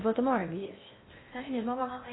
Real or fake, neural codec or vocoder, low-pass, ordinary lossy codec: fake; codec, 16 kHz in and 24 kHz out, 0.6 kbps, FocalCodec, streaming, 2048 codes; 7.2 kHz; AAC, 16 kbps